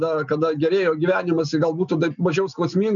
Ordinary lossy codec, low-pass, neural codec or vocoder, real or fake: MP3, 96 kbps; 7.2 kHz; none; real